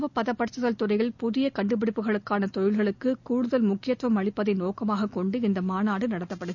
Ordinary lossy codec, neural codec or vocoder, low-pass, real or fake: none; none; 7.2 kHz; real